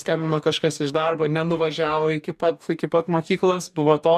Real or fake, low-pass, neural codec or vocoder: fake; 14.4 kHz; codec, 44.1 kHz, 2.6 kbps, DAC